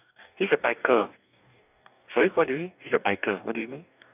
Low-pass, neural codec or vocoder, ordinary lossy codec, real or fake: 3.6 kHz; codec, 44.1 kHz, 2.6 kbps, DAC; none; fake